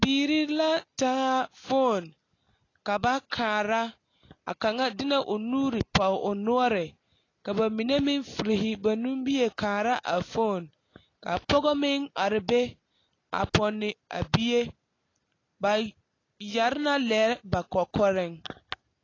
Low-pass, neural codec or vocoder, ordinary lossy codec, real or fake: 7.2 kHz; none; AAC, 32 kbps; real